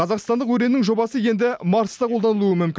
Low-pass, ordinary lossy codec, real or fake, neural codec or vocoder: none; none; real; none